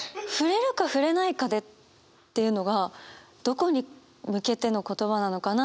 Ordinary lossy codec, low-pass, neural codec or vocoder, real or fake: none; none; none; real